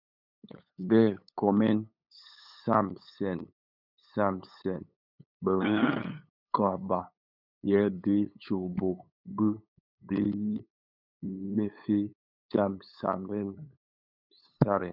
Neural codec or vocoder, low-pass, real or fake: codec, 16 kHz, 8 kbps, FunCodec, trained on LibriTTS, 25 frames a second; 5.4 kHz; fake